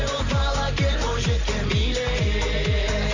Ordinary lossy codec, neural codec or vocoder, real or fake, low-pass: Opus, 64 kbps; none; real; 7.2 kHz